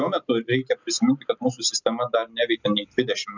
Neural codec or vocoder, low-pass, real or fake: none; 7.2 kHz; real